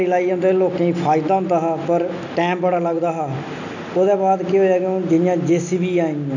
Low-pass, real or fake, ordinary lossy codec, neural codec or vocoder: 7.2 kHz; real; none; none